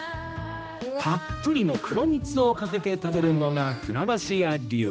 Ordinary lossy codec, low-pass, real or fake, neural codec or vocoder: none; none; fake; codec, 16 kHz, 1 kbps, X-Codec, HuBERT features, trained on general audio